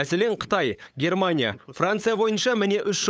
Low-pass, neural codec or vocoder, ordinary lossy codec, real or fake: none; codec, 16 kHz, 8 kbps, FunCodec, trained on LibriTTS, 25 frames a second; none; fake